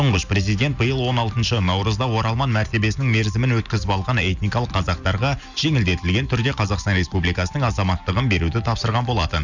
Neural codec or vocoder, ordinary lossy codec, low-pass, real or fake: none; none; 7.2 kHz; real